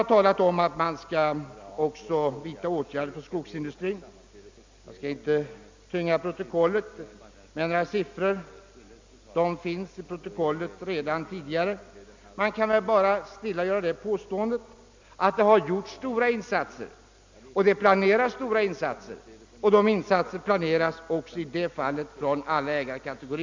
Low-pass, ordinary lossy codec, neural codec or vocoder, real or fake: 7.2 kHz; MP3, 64 kbps; none; real